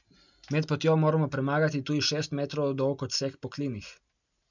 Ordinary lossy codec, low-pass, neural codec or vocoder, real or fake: none; 7.2 kHz; none; real